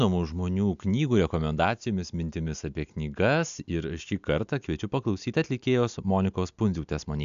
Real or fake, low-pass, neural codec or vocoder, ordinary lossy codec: real; 7.2 kHz; none; AAC, 96 kbps